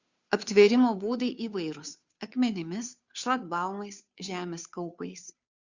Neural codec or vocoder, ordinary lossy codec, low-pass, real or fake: codec, 16 kHz, 2 kbps, FunCodec, trained on Chinese and English, 25 frames a second; Opus, 64 kbps; 7.2 kHz; fake